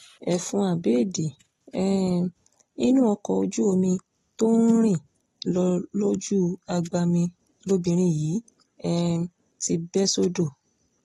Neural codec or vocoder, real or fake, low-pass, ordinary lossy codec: none; real; 19.8 kHz; AAC, 32 kbps